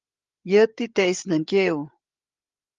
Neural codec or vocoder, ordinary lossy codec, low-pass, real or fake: codec, 16 kHz, 8 kbps, FreqCodec, larger model; Opus, 32 kbps; 7.2 kHz; fake